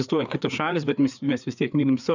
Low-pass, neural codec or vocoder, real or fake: 7.2 kHz; codec, 16 kHz, 4 kbps, FreqCodec, larger model; fake